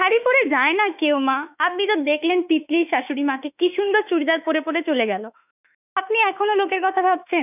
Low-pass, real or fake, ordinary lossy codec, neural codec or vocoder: 3.6 kHz; fake; none; autoencoder, 48 kHz, 32 numbers a frame, DAC-VAE, trained on Japanese speech